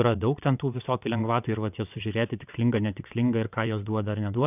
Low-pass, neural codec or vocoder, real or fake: 3.6 kHz; vocoder, 22.05 kHz, 80 mel bands, WaveNeXt; fake